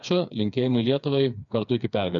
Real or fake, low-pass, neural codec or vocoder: fake; 7.2 kHz; codec, 16 kHz, 4 kbps, FreqCodec, smaller model